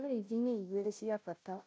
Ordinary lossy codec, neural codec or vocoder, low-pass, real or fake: none; codec, 16 kHz, 0.5 kbps, FunCodec, trained on Chinese and English, 25 frames a second; none; fake